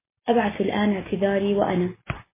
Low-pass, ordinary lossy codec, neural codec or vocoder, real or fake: 3.6 kHz; MP3, 16 kbps; none; real